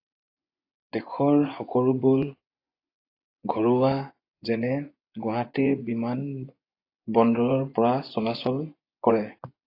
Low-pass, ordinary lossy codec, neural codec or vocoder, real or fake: 5.4 kHz; AAC, 32 kbps; vocoder, 24 kHz, 100 mel bands, Vocos; fake